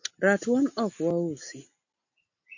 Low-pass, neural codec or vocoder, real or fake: 7.2 kHz; none; real